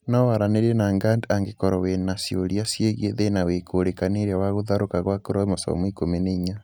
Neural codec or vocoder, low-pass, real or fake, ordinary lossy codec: none; none; real; none